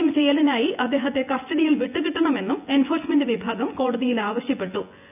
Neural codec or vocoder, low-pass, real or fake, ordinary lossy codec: vocoder, 24 kHz, 100 mel bands, Vocos; 3.6 kHz; fake; none